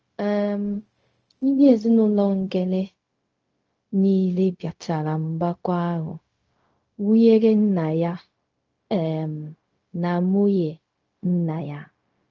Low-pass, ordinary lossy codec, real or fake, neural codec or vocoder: 7.2 kHz; Opus, 24 kbps; fake; codec, 16 kHz, 0.4 kbps, LongCat-Audio-Codec